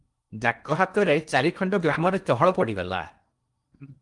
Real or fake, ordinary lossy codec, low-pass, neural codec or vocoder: fake; Opus, 32 kbps; 10.8 kHz; codec, 16 kHz in and 24 kHz out, 0.8 kbps, FocalCodec, streaming, 65536 codes